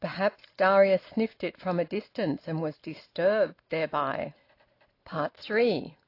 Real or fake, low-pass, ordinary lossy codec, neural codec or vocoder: real; 5.4 kHz; AAC, 32 kbps; none